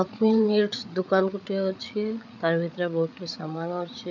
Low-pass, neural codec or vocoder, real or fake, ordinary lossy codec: 7.2 kHz; codec, 16 kHz, 8 kbps, FreqCodec, larger model; fake; none